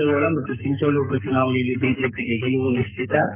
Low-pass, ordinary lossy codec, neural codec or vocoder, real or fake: 3.6 kHz; none; codec, 44.1 kHz, 2.6 kbps, SNAC; fake